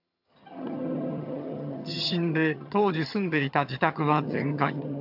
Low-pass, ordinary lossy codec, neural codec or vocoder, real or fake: 5.4 kHz; AAC, 48 kbps; vocoder, 22.05 kHz, 80 mel bands, HiFi-GAN; fake